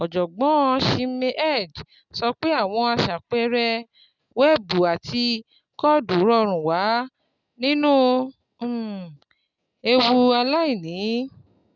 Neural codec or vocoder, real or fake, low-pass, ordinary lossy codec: none; real; 7.2 kHz; none